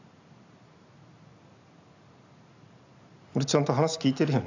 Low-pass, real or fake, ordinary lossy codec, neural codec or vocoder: 7.2 kHz; real; AAC, 48 kbps; none